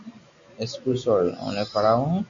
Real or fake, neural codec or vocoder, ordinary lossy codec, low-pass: real; none; AAC, 48 kbps; 7.2 kHz